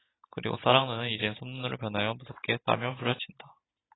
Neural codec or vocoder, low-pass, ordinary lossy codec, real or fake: none; 7.2 kHz; AAC, 16 kbps; real